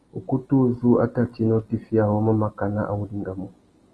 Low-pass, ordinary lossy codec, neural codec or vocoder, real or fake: 10.8 kHz; Opus, 24 kbps; none; real